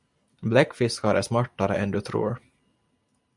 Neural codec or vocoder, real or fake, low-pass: none; real; 10.8 kHz